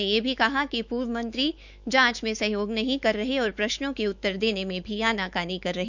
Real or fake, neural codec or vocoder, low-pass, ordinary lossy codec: fake; autoencoder, 48 kHz, 128 numbers a frame, DAC-VAE, trained on Japanese speech; 7.2 kHz; none